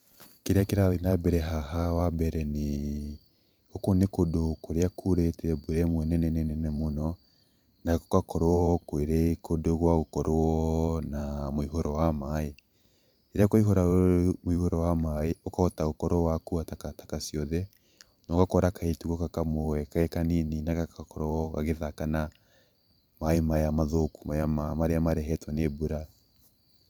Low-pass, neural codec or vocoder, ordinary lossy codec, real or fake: none; vocoder, 44.1 kHz, 128 mel bands every 512 samples, BigVGAN v2; none; fake